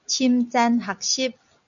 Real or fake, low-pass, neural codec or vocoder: real; 7.2 kHz; none